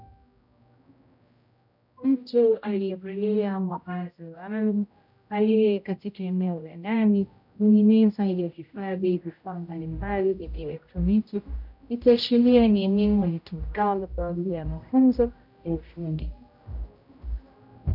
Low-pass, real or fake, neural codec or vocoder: 5.4 kHz; fake; codec, 16 kHz, 0.5 kbps, X-Codec, HuBERT features, trained on general audio